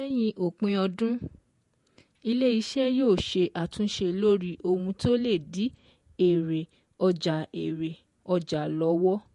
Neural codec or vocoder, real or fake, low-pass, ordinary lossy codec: vocoder, 48 kHz, 128 mel bands, Vocos; fake; 14.4 kHz; MP3, 48 kbps